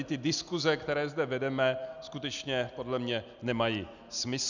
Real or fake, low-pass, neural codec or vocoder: real; 7.2 kHz; none